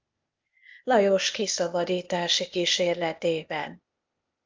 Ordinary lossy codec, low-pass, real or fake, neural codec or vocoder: Opus, 24 kbps; 7.2 kHz; fake; codec, 16 kHz, 0.8 kbps, ZipCodec